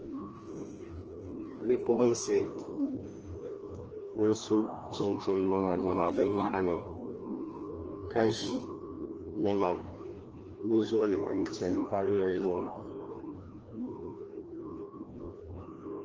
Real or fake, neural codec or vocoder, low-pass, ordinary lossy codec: fake; codec, 16 kHz, 1 kbps, FreqCodec, larger model; 7.2 kHz; Opus, 16 kbps